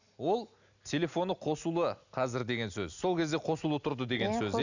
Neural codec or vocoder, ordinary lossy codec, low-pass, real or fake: none; none; 7.2 kHz; real